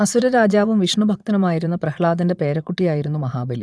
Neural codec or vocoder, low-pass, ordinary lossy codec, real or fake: vocoder, 22.05 kHz, 80 mel bands, Vocos; none; none; fake